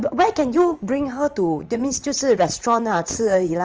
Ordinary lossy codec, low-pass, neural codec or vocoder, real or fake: Opus, 24 kbps; 7.2 kHz; vocoder, 44.1 kHz, 80 mel bands, Vocos; fake